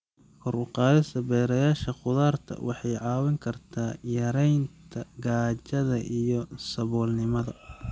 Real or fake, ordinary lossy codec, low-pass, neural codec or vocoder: real; none; none; none